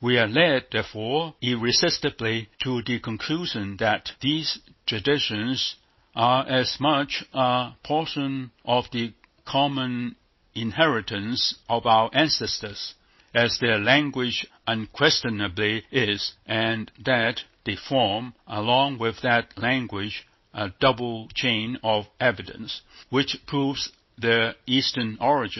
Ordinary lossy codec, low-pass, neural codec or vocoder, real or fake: MP3, 24 kbps; 7.2 kHz; none; real